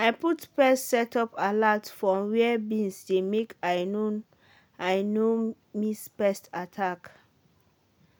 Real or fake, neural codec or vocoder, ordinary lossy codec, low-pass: real; none; none; none